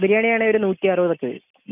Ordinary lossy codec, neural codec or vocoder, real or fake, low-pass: none; none; real; 3.6 kHz